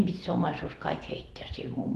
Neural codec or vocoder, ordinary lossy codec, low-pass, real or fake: autoencoder, 48 kHz, 128 numbers a frame, DAC-VAE, trained on Japanese speech; Opus, 16 kbps; 14.4 kHz; fake